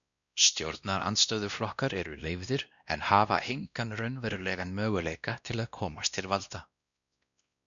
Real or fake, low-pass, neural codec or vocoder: fake; 7.2 kHz; codec, 16 kHz, 1 kbps, X-Codec, WavLM features, trained on Multilingual LibriSpeech